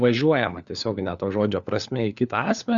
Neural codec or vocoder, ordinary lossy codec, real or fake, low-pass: codec, 16 kHz, 4 kbps, FunCodec, trained on LibriTTS, 50 frames a second; Opus, 64 kbps; fake; 7.2 kHz